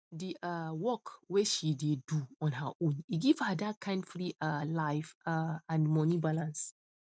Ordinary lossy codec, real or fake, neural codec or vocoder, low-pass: none; real; none; none